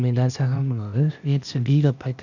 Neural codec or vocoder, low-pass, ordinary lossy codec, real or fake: codec, 16 kHz, 0.8 kbps, ZipCodec; 7.2 kHz; none; fake